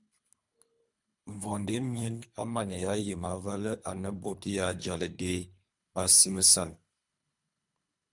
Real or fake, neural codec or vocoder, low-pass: fake; codec, 24 kHz, 3 kbps, HILCodec; 10.8 kHz